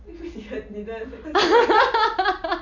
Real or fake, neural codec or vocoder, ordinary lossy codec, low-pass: real; none; none; 7.2 kHz